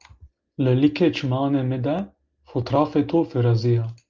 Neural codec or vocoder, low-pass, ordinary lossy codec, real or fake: none; 7.2 kHz; Opus, 32 kbps; real